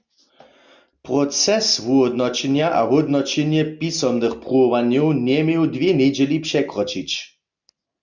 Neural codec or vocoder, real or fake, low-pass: none; real; 7.2 kHz